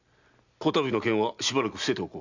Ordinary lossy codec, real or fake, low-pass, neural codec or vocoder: none; real; 7.2 kHz; none